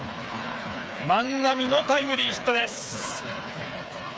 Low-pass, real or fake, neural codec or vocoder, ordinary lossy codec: none; fake; codec, 16 kHz, 4 kbps, FreqCodec, smaller model; none